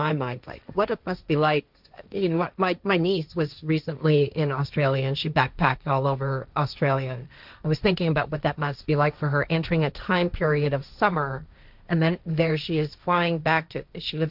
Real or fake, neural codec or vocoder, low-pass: fake; codec, 16 kHz, 1.1 kbps, Voila-Tokenizer; 5.4 kHz